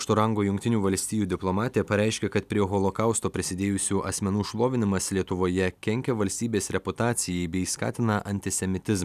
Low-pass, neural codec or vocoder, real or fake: 14.4 kHz; none; real